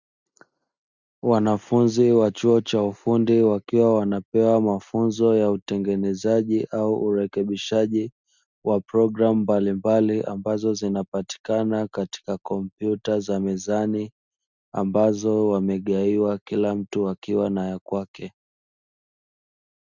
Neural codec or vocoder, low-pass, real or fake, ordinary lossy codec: none; 7.2 kHz; real; Opus, 64 kbps